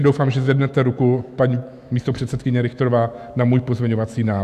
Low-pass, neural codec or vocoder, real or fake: 14.4 kHz; autoencoder, 48 kHz, 128 numbers a frame, DAC-VAE, trained on Japanese speech; fake